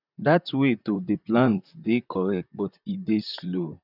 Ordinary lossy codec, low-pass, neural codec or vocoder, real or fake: none; 5.4 kHz; vocoder, 44.1 kHz, 128 mel bands, Pupu-Vocoder; fake